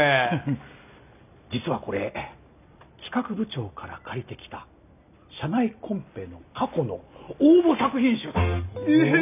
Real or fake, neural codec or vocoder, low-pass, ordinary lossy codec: real; none; 3.6 kHz; none